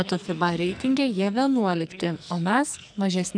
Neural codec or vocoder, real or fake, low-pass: codec, 44.1 kHz, 2.6 kbps, SNAC; fake; 9.9 kHz